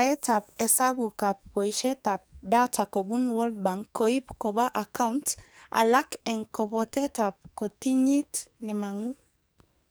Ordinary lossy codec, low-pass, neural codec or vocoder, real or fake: none; none; codec, 44.1 kHz, 2.6 kbps, SNAC; fake